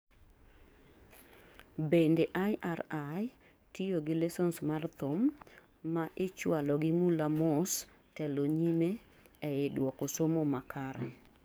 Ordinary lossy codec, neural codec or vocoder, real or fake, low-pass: none; codec, 44.1 kHz, 7.8 kbps, DAC; fake; none